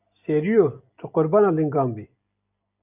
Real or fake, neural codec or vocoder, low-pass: real; none; 3.6 kHz